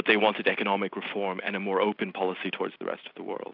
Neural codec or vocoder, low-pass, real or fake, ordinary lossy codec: none; 5.4 kHz; real; AAC, 48 kbps